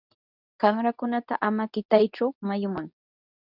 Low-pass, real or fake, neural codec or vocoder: 5.4 kHz; fake; codec, 24 kHz, 0.9 kbps, WavTokenizer, medium speech release version 2